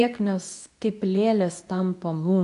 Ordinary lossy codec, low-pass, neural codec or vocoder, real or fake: MP3, 96 kbps; 10.8 kHz; codec, 24 kHz, 0.9 kbps, WavTokenizer, medium speech release version 2; fake